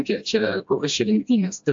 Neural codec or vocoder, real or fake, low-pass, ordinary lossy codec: codec, 16 kHz, 1 kbps, FreqCodec, smaller model; fake; 7.2 kHz; AAC, 64 kbps